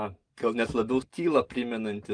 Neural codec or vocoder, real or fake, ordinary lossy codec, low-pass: none; real; Opus, 24 kbps; 9.9 kHz